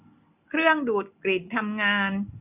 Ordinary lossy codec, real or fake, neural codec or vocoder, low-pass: none; real; none; 3.6 kHz